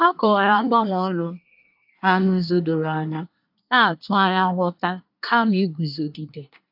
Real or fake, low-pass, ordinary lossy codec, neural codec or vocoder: fake; 5.4 kHz; AAC, 48 kbps; codec, 24 kHz, 1 kbps, SNAC